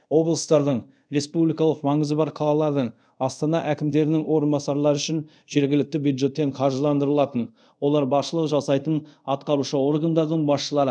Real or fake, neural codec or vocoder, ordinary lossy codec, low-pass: fake; codec, 24 kHz, 0.5 kbps, DualCodec; none; 9.9 kHz